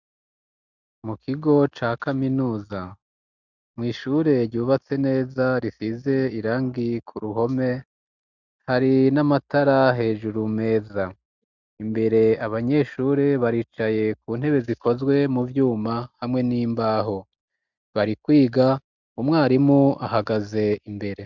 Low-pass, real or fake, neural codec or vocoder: 7.2 kHz; real; none